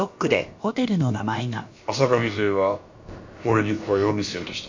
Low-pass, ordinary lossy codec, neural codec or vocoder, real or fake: 7.2 kHz; MP3, 48 kbps; codec, 16 kHz, about 1 kbps, DyCAST, with the encoder's durations; fake